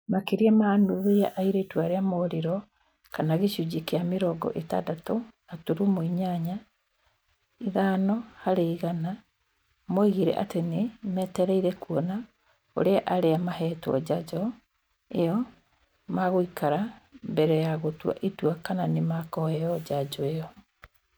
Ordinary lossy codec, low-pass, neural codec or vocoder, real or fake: none; none; none; real